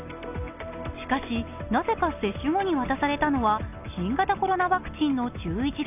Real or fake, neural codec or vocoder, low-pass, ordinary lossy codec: real; none; 3.6 kHz; none